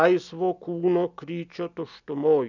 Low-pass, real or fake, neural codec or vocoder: 7.2 kHz; real; none